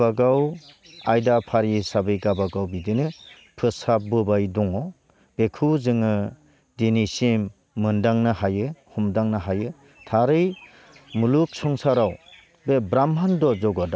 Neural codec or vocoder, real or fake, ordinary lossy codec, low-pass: none; real; none; none